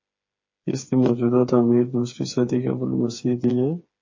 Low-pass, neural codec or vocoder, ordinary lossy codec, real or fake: 7.2 kHz; codec, 16 kHz, 4 kbps, FreqCodec, smaller model; MP3, 32 kbps; fake